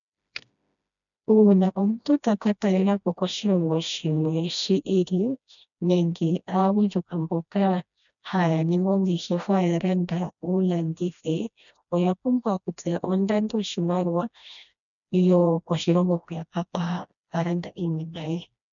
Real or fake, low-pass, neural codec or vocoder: fake; 7.2 kHz; codec, 16 kHz, 1 kbps, FreqCodec, smaller model